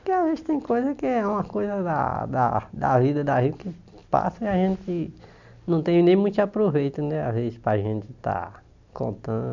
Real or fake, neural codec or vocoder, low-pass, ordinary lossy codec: real; none; 7.2 kHz; none